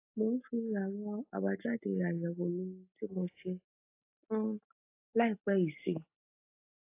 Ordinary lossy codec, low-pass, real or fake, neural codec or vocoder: AAC, 24 kbps; 3.6 kHz; real; none